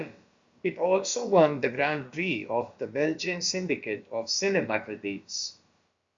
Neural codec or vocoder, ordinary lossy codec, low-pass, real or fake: codec, 16 kHz, about 1 kbps, DyCAST, with the encoder's durations; Opus, 64 kbps; 7.2 kHz; fake